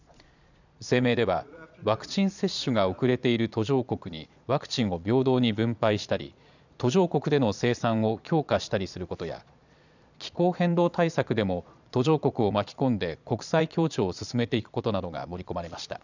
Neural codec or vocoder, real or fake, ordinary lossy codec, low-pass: vocoder, 22.05 kHz, 80 mel bands, Vocos; fake; none; 7.2 kHz